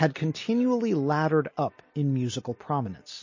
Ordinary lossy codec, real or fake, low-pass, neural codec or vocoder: MP3, 32 kbps; real; 7.2 kHz; none